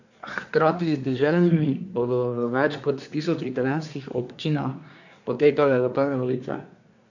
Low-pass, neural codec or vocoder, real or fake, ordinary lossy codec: 7.2 kHz; codec, 24 kHz, 1 kbps, SNAC; fake; none